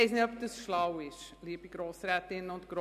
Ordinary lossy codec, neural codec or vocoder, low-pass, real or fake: none; none; 14.4 kHz; real